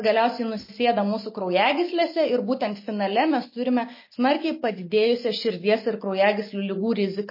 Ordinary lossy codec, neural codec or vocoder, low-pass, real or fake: MP3, 24 kbps; none; 5.4 kHz; real